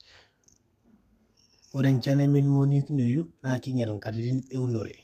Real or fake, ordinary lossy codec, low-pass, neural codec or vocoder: fake; none; 14.4 kHz; codec, 32 kHz, 1.9 kbps, SNAC